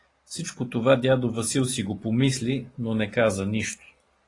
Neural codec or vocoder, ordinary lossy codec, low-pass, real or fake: none; AAC, 32 kbps; 10.8 kHz; real